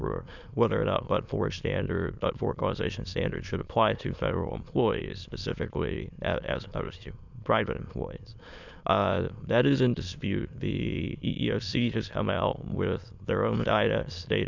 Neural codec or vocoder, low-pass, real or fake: autoencoder, 22.05 kHz, a latent of 192 numbers a frame, VITS, trained on many speakers; 7.2 kHz; fake